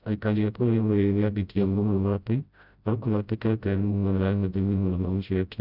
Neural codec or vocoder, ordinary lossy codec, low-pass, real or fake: codec, 16 kHz, 0.5 kbps, FreqCodec, smaller model; none; 5.4 kHz; fake